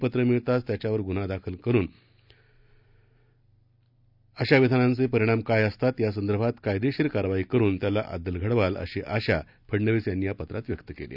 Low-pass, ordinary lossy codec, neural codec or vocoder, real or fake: 5.4 kHz; none; none; real